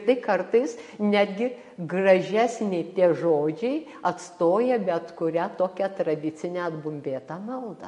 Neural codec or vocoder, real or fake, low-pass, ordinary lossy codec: none; real; 14.4 kHz; MP3, 48 kbps